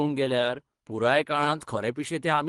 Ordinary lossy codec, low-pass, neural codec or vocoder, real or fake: Opus, 24 kbps; 10.8 kHz; codec, 24 kHz, 3 kbps, HILCodec; fake